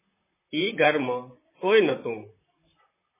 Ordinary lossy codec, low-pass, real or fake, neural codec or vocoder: MP3, 16 kbps; 3.6 kHz; fake; vocoder, 44.1 kHz, 128 mel bands, Pupu-Vocoder